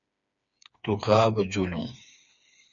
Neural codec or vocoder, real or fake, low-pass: codec, 16 kHz, 4 kbps, FreqCodec, smaller model; fake; 7.2 kHz